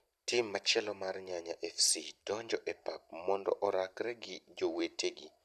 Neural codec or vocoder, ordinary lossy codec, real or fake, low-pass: vocoder, 44.1 kHz, 128 mel bands every 256 samples, BigVGAN v2; none; fake; 14.4 kHz